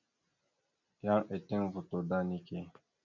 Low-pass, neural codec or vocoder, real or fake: 7.2 kHz; none; real